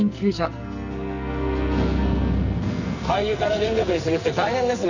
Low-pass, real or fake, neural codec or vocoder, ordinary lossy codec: 7.2 kHz; fake; codec, 44.1 kHz, 2.6 kbps, SNAC; none